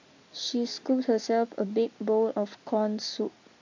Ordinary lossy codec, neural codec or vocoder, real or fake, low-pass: AAC, 48 kbps; none; real; 7.2 kHz